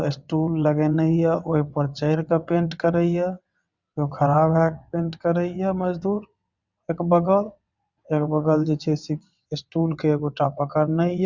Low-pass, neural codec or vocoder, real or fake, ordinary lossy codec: 7.2 kHz; vocoder, 22.05 kHz, 80 mel bands, WaveNeXt; fake; Opus, 64 kbps